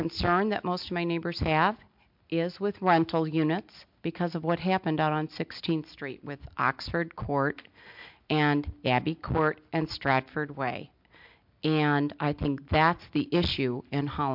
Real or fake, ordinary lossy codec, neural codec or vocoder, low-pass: real; MP3, 48 kbps; none; 5.4 kHz